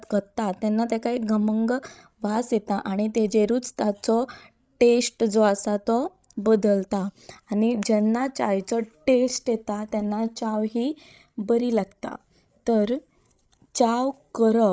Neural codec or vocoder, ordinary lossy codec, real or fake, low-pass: codec, 16 kHz, 16 kbps, FreqCodec, larger model; none; fake; none